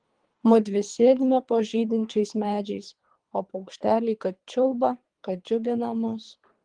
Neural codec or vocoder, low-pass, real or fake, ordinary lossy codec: codec, 24 kHz, 3 kbps, HILCodec; 9.9 kHz; fake; Opus, 24 kbps